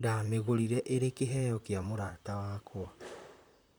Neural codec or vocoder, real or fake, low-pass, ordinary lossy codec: vocoder, 44.1 kHz, 128 mel bands, Pupu-Vocoder; fake; none; none